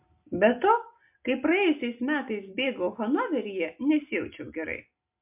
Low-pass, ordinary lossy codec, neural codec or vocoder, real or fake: 3.6 kHz; MP3, 32 kbps; none; real